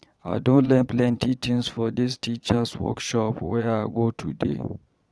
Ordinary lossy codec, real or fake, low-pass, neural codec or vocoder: none; fake; none; vocoder, 22.05 kHz, 80 mel bands, Vocos